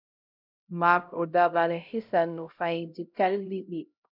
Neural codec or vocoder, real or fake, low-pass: codec, 16 kHz, 0.5 kbps, X-Codec, HuBERT features, trained on LibriSpeech; fake; 5.4 kHz